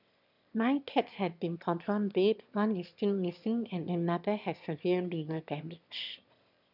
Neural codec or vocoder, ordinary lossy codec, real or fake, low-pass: autoencoder, 22.05 kHz, a latent of 192 numbers a frame, VITS, trained on one speaker; none; fake; 5.4 kHz